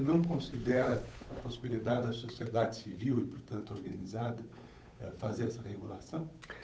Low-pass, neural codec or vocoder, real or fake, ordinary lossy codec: none; codec, 16 kHz, 8 kbps, FunCodec, trained on Chinese and English, 25 frames a second; fake; none